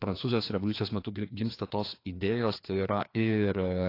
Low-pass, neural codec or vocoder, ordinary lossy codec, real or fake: 5.4 kHz; codec, 16 kHz, 2 kbps, FreqCodec, larger model; AAC, 32 kbps; fake